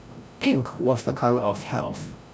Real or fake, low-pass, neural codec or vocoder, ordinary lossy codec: fake; none; codec, 16 kHz, 0.5 kbps, FreqCodec, larger model; none